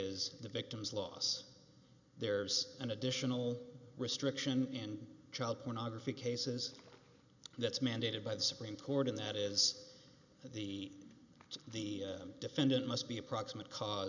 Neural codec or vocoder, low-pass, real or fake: none; 7.2 kHz; real